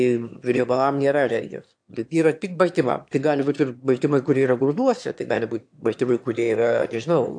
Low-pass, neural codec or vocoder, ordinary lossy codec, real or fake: 9.9 kHz; autoencoder, 22.05 kHz, a latent of 192 numbers a frame, VITS, trained on one speaker; AAC, 64 kbps; fake